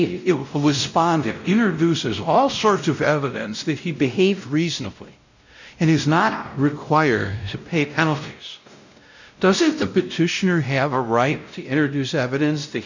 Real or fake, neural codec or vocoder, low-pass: fake; codec, 16 kHz, 0.5 kbps, X-Codec, WavLM features, trained on Multilingual LibriSpeech; 7.2 kHz